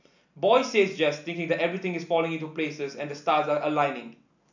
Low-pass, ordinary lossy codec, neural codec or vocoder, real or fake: 7.2 kHz; none; none; real